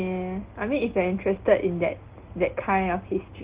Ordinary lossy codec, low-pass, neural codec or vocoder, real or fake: Opus, 16 kbps; 3.6 kHz; none; real